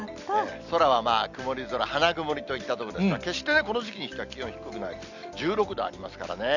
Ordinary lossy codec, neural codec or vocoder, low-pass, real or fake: none; none; 7.2 kHz; real